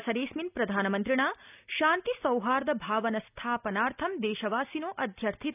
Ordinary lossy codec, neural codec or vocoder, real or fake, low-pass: none; none; real; 3.6 kHz